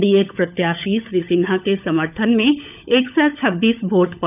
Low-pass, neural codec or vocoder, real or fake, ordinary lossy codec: 3.6 kHz; codec, 16 kHz, 16 kbps, FunCodec, trained on LibriTTS, 50 frames a second; fake; none